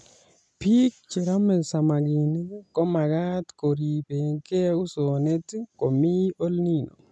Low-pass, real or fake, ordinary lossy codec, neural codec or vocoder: none; real; none; none